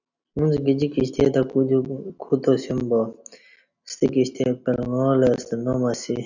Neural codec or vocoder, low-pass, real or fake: none; 7.2 kHz; real